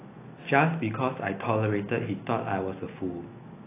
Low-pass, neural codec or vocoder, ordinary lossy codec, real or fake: 3.6 kHz; none; AAC, 24 kbps; real